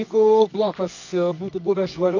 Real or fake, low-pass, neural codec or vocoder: fake; 7.2 kHz; codec, 24 kHz, 0.9 kbps, WavTokenizer, medium music audio release